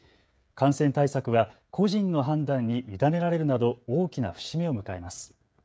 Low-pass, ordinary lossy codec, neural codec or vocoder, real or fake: none; none; codec, 16 kHz, 16 kbps, FreqCodec, smaller model; fake